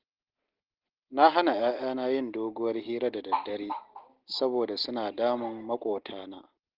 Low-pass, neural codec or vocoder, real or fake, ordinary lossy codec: 5.4 kHz; none; real; Opus, 16 kbps